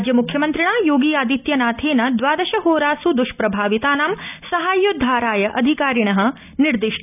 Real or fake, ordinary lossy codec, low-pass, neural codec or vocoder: real; none; 3.6 kHz; none